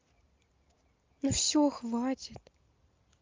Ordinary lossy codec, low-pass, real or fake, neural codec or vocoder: Opus, 24 kbps; 7.2 kHz; real; none